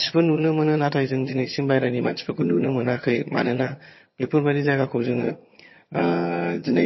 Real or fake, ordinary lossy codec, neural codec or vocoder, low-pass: fake; MP3, 24 kbps; vocoder, 22.05 kHz, 80 mel bands, HiFi-GAN; 7.2 kHz